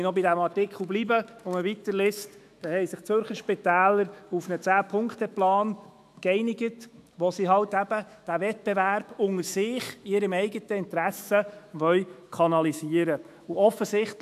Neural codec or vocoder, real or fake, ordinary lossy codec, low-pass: autoencoder, 48 kHz, 128 numbers a frame, DAC-VAE, trained on Japanese speech; fake; AAC, 96 kbps; 14.4 kHz